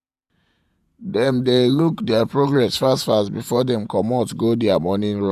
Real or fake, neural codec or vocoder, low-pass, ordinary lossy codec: fake; vocoder, 48 kHz, 128 mel bands, Vocos; 14.4 kHz; none